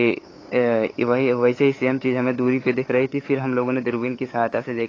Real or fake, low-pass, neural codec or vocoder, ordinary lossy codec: fake; 7.2 kHz; codec, 16 kHz, 8 kbps, FunCodec, trained on LibriTTS, 25 frames a second; AAC, 32 kbps